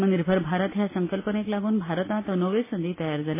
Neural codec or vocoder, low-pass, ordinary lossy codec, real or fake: none; 3.6 kHz; MP3, 16 kbps; real